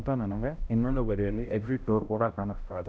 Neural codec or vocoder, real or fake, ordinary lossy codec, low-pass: codec, 16 kHz, 0.5 kbps, X-Codec, HuBERT features, trained on balanced general audio; fake; none; none